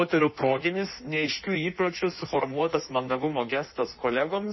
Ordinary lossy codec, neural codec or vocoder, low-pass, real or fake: MP3, 24 kbps; codec, 16 kHz in and 24 kHz out, 1.1 kbps, FireRedTTS-2 codec; 7.2 kHz; fake